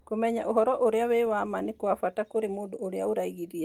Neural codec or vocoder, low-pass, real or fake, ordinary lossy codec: none; 19.8 kHz; real; Opus, 32 kbps